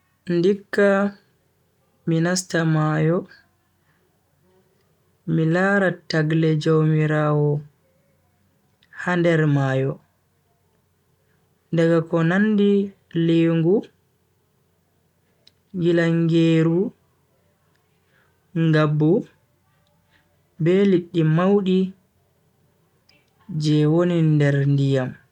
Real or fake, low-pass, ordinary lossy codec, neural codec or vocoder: real; 19.8 kHz; none; none